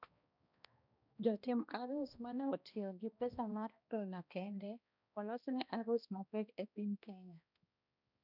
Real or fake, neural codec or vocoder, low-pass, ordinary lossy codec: fake; codec, 16 kHz, 1 kbps, X-Codec, HuBERT features, trained on balanced general audio; 5.4 kHz; none